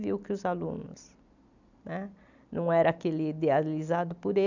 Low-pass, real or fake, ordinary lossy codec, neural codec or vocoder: 7.2 kHz; real; none; none